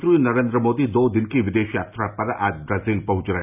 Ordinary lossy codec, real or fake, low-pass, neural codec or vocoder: none; real; 3.6 kHz; none